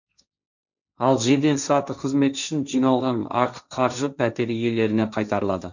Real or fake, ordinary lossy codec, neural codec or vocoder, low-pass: fake; none; codec, 16 kHz, 1.1 kbps, Voila-Tokenizer; 7.2 kHz